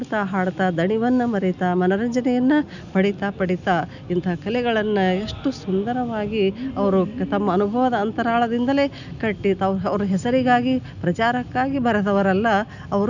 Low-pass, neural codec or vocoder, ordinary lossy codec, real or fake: 7.2 kHz; none; none; real